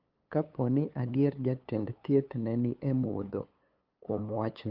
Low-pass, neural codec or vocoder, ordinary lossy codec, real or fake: 5.4 kHz; codec, 16 kHz, 8 kbps, FunCodec, trained on LibriTTS, 25 frames a second; Opus, 32 kbps; fake